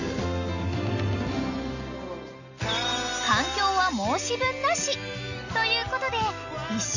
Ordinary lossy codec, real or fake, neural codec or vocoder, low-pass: none; real; none; 7.2 kHz